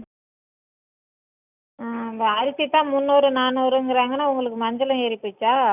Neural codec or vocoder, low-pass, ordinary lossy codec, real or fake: none; 3.6 kHz; none; real